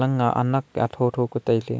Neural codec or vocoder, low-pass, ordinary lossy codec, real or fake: none; none; none; real